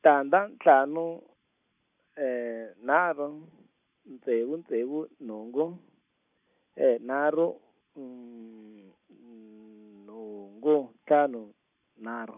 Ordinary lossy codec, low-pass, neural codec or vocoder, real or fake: none; 3.6 kHz; none; real